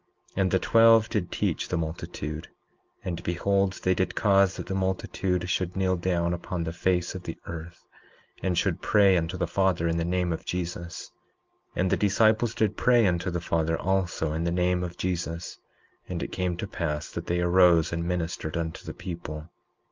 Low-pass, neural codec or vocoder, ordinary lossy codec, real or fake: 7.2 kHz; none; Opus, 24 kbps; real